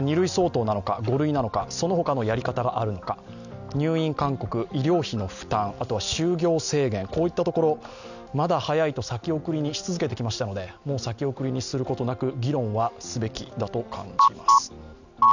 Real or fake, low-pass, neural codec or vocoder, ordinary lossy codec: real; 7.2 kHz; none; none